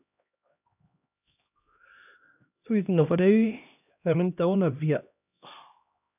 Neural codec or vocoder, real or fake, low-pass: codec, 16 kHz, 1 kbps, X-Codec, HuBERT features, trained on LibriSpeech; fake; 3.6 kHz